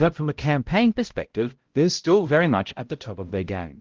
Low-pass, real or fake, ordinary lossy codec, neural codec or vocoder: 7.2 kHz; fake; Opus, 16 kbps; codec, 16 kHz, 0.5 kbps, X-Codec, HuBERT features, trained on balanced general audio